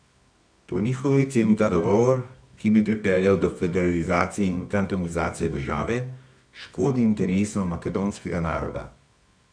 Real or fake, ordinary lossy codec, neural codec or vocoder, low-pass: fake; none; codec, 24 kHz, 0.9 kbps, WavTokenizer, medium music audio release; 9.9 kHz